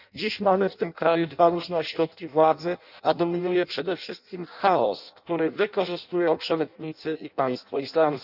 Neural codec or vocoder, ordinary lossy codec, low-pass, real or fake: codec, 16 kHz in and 24 kHz out, 0.6 kbps, FireRedTTS-2 codec; none; 5.4 kHz; fake